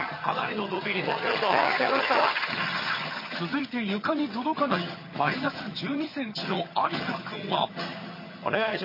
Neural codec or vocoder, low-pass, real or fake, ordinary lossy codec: vocoder, 22.05 kHz, 80 mel bands, HiFi-GAN; 5.4 kHz; fake; MP3, 24 kbps